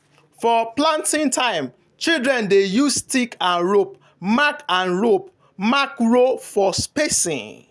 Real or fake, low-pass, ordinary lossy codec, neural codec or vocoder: real; none; none; none